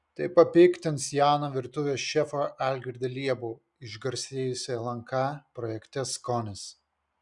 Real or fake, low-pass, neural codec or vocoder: real; 10.8 kHz; none